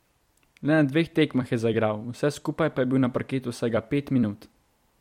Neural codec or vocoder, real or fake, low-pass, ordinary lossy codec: vocoder, 44.1 kHz, 128 mel bands every 256 samples, BigVGAN v2; fake; 19.8 kHz; MP3, 64 kbps